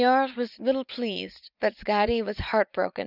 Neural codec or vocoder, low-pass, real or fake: vocoder, 44.1 kHz, 80 mel bands, Vocos; 5.4 kHz; fake